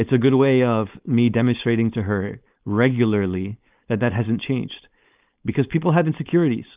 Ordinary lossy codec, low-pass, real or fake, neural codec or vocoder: Opus, 24 kbps; 3.6 kHz; fake; codec, 16 kHz, 4.8 kbps, FACodec